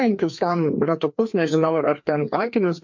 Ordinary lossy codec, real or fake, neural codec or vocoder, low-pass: MP3, 48 kbps; fake; codec, 16 kHz, 2 kbps, FreqCodec, larger model; 7.2 kHz